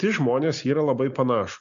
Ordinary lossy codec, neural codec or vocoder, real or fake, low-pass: AAC, 96 kbps; none; real; 7.2 kHz